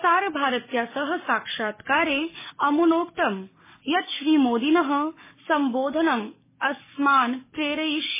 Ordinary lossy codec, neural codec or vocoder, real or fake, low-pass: MP3, 16 kbps; codec, 44.1 kHz, 7.8 kbps, DAC; fake; 3.6 kHz